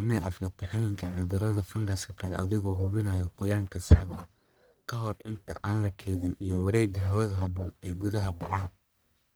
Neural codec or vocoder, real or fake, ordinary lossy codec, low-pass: codec, 44.1 kHz, 1.7 kbps, Pupu-Codec; fake; none; none